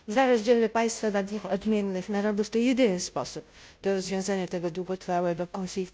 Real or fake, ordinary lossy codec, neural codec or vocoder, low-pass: fake; none; codec, 16 kHz, 0.5 kbps, FunCodec, trained on Chinese and English, 25 frames a second; none